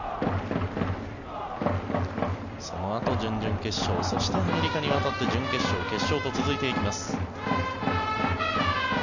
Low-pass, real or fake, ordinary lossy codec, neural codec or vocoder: 7.2 kHz; real; none; none